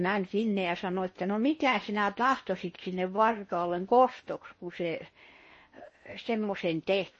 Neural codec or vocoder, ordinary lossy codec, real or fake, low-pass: codec, 16 kHz in and 24 kHz out, 0.8 kbps, FocalCodec, streaming, 65536 codes; MP3, 32 kbps; fake; 10.8 kHz